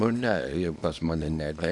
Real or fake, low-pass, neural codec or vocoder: fake; 10.8 kHz; codec, 24 kHz, 0.9 kbps, WavTokenizer, small release